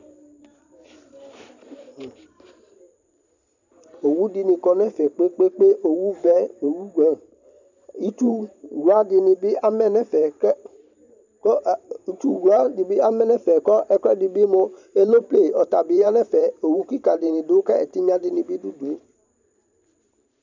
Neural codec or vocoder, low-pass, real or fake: vocoder, 44.1 kHz, 128 mel bands every 512 samples, BigVGAN v2; 7.2 kHz; fake